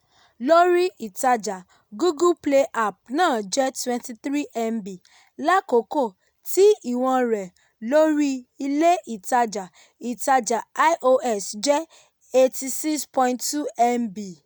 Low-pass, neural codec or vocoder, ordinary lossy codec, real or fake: none; none; none; real